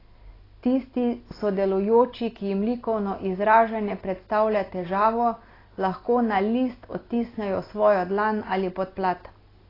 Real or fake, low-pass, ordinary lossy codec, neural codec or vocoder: real; 5.4 kHz; AAC, 24 kbps; none